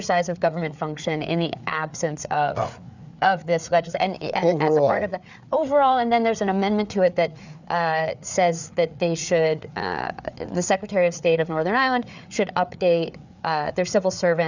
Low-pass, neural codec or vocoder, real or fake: 7.2 kHz; codec, 16 kHz, 4 kbps, FreqCodec, larger model; fake